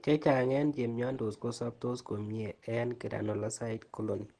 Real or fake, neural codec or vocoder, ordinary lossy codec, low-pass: real; none; Opus, 16 kbps; 9.9 kHz